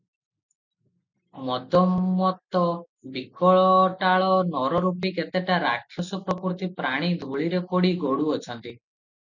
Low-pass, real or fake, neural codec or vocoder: 7.2 kHz; real; none